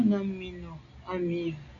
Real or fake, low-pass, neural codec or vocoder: real; 7.2 kHz; none